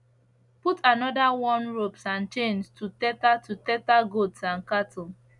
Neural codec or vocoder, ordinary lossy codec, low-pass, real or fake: none; none; 10.8 kHz; real